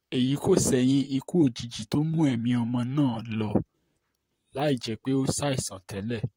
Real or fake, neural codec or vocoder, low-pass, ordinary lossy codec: fake; vocoder, 44.1 kHz, 128 mel bands, Pupu-Vocoder; 19.8 kHz; AAC, 48 kbps